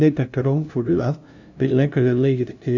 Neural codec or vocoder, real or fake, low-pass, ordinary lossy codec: codec, 16 kHz, 0.5 kbps, FunCodec, trained on LibriTTS, 25 frames a second; fake; 7.2 kHz; none